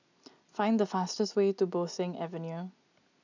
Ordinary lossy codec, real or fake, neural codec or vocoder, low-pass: none; real; none; 7.2 kHz